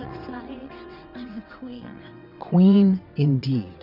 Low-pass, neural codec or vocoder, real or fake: 5.4 kHz; vocoder, 22.05 kHz, 80 mel bands, WaveNeXt; fake